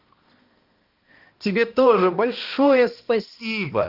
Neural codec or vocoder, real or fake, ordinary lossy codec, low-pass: codec, 16 kHz, 1 kbps, X-Codec, HuBERT features, trained on balanced general audio; fake; Opus, 32 kbps; 5.4 kHz